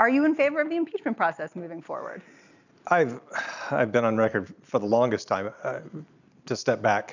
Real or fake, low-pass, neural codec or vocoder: real; 7.2 kHz; none